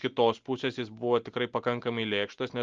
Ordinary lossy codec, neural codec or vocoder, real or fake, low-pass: Opus, 32 kbps; none; real; 7.2 kHz